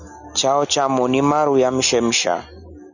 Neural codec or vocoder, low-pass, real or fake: none; 7.2 kHz; real